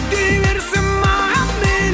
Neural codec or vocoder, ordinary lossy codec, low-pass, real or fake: none; none; none; real